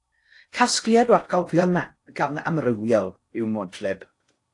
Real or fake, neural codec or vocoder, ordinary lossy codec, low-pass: fake; codec, 16 kHz in and 24 kHz out, 0.6 kbps, FocalCodec, streaming, 4096 codes; AAC, 48 kbps; 10.8 kHz